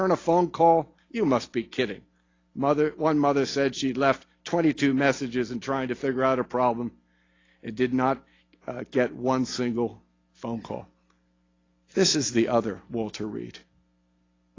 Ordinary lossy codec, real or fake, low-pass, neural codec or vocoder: AAC, 32 kbps; real; 7.2 kHz; none